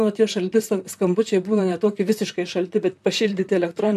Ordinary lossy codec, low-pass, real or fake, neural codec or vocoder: MP3, 96 kbps; 14.4 kHz; fake; vocoder, 44.1 kHz, 128 mel bands, Pupu-Vocoder